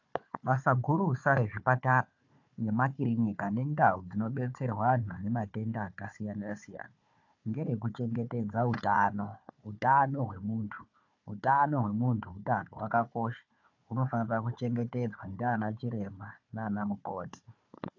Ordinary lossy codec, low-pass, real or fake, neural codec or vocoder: AAC, 48 kbps; 7.2 kHz; fake; codec, 16 kHz, 4 kbps, FunCodec, trained on Chinese and English, 50 frames a second